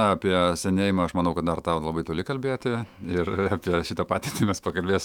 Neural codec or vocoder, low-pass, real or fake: autoencoder, 48 kHz, 128 numbers a frame, DAC-VAE, trained on Japanese speech; 19.8 kHz; fake